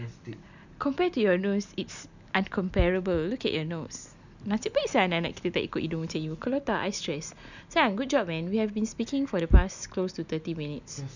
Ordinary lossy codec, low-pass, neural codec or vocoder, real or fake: none; 7.2 kHz; none; real